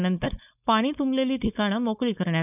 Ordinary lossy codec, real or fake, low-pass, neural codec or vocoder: none; fake; 3.6 kHz; codec, 16 kHz, 4.8 kbps, FACodec